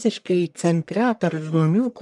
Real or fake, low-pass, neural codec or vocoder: fake; 10.8 kHz; codec, 44.1 kHz, 1.7 kbps, Pupu-Codec